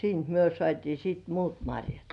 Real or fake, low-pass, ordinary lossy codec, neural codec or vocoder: real; 10.8 kHz; none; none